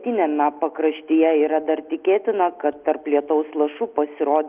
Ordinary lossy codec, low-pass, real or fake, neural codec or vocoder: Opus, 32 kbps; 3.6 kHz; real; none